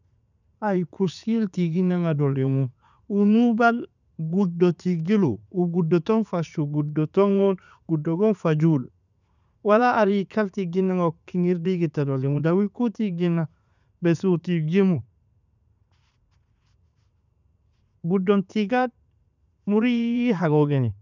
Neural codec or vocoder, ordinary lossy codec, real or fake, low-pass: vocoder, 44.1 kHz, 80 mel bands, Vocos; none; fake; 7.2 kHz